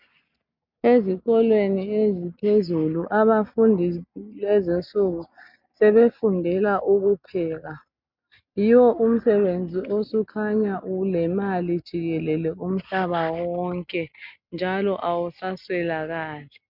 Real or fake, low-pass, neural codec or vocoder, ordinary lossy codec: real; 5.4 kHz; none; MP3, 48 kbps